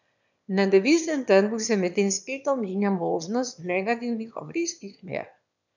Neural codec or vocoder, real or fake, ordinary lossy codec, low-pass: autoencoder, 22.05 kHz, a latent of 192 numbers a frame, VITS, trained on one speaker; fake; none; 7.2 kHz